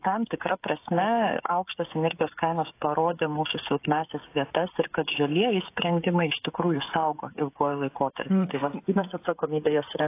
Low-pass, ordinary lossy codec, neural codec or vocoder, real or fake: 3.6 kHz; AAC, 24 kbps; codec, 16 kHz, 16 kbps, FreqCodec, smaller model; fake